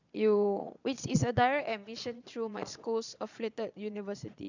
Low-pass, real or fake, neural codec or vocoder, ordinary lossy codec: 7.2 kHz; fake; vocoder, 22.05 kHz, 80 mel bands, Vocos; none